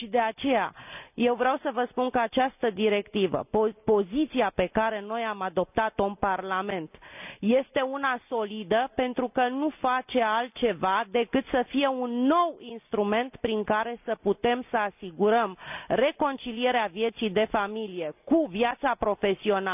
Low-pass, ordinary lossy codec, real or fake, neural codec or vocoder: 3.6 kHz; none; real; none